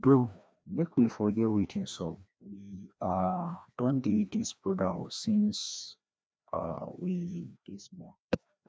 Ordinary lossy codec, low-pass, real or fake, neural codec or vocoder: none; none; fake; codec, 16 kHz, 1 kbps, FreqCodec, larger model